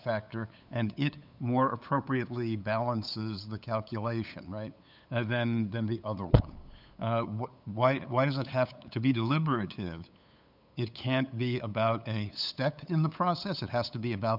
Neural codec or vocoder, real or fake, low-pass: codec, 16 kHz, 8 kbps, FunCodec, trained on LibriTTS, 25 frames a second; fake; 5.4 kHz